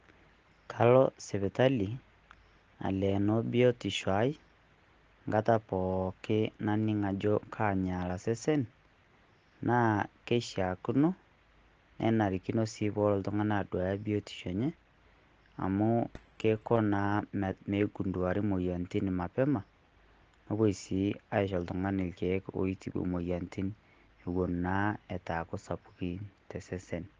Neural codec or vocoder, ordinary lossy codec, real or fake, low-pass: none; Opus, 16 kbps; real; 7.2 kHz